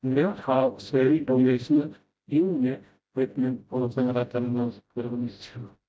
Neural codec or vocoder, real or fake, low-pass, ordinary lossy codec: codec, 16 kHz, 0.5 kbps, FreqCodec, smaller model; fake; none; none